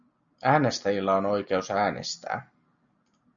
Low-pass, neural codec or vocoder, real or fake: 7.2 kHz; none; real